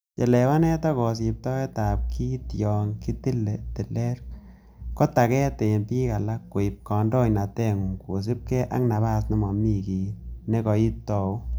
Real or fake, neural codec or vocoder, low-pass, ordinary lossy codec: real; none; none; none